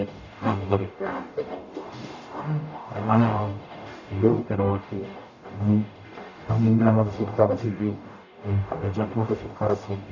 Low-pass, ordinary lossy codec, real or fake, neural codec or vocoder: 7.2 kHz; none; fake; codec, 44.1 kHz, 0.9 kbps, DAC